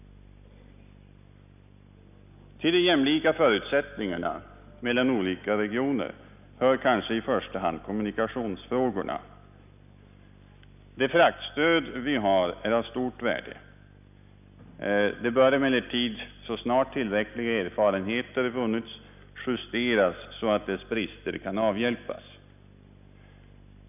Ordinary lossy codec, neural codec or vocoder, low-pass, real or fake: none; none; 3.6 kHz; real